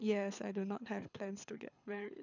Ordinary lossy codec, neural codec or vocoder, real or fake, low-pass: none; codec, 24 kHz, 6 kbps, HILCodec; fake; 7.2 kHz